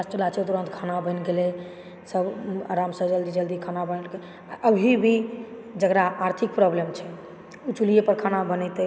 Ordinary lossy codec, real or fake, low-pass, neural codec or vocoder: none; real; none; none